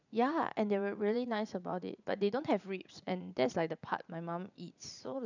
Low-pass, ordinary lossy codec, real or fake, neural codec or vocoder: 7.2 kHz; none; fake; vocoder, 44.1 kHz, 80 mel bands, Vocos